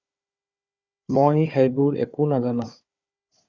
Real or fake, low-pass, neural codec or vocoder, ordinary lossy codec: fake; 7.2 kHz; codec, 16 kHz, 4 kbps, FunCodec, trained on Chinese and English, 50 frames a second; Opus, 64 kbps